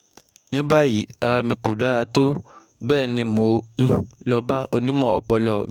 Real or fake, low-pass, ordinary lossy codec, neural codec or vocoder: fake; 19.8 kHz; none; codec, 44.1 kHz, 2.6 kbps, DAC